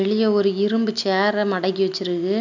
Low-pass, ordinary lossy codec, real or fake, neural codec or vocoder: 7.2 kHz; none; real; none